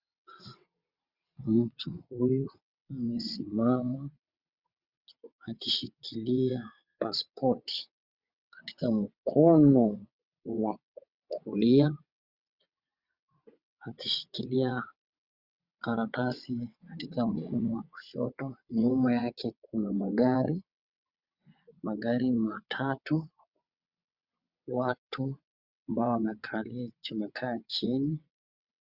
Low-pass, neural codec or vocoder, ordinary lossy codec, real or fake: 5.4 kHz; vocoder, 22.05 kHz, 80 mel bands, WaveNeXt; Opus, 64 kbps; fake